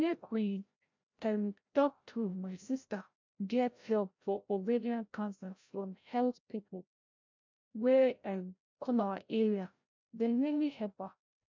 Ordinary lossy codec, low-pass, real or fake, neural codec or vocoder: MP3, 64 kbps; 7.2 kHz; fake; codec, 16 kHz, 0.5 kbps, FreqCodec, larger model